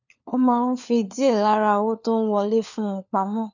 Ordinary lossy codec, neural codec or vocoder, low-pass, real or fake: none; codec, 16 kHz, 4 kbps, FunCodec, trained on LibriTTS, 50 frames a second; 7.2 kHz; fake